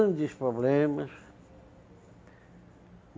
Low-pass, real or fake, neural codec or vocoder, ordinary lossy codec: none; real; none; none